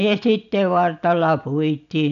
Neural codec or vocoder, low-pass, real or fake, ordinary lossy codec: none; 7.2 kHz; real; none